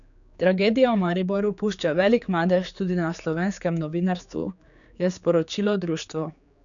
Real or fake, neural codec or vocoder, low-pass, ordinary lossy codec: fake; codec, 16 kHz, 4 kbps, X-Codec, HuBERT features, trained on general audio; 7.2 kHz; none